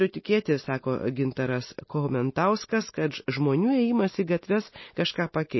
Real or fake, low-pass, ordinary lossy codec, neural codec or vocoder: real; 7.2 kHz; MP3, 24 kbps; none